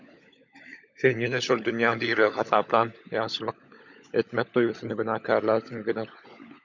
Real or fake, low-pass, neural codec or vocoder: fake; 7.2 kHz; codec, 16 kHz, 16 kbps, FunCodec, trained on LibriTTS, 50 frames a second